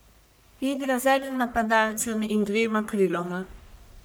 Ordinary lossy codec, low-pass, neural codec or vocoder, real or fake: none; none; codec, 44.1 kHz, 1.7 kbps, Pupu-Codec; fake